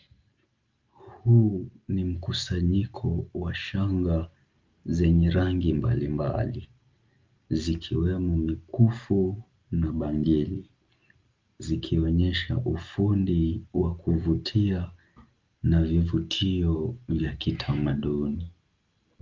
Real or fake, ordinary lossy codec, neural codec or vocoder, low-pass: real; Opus, 32 kbps; none; 7.2 kHz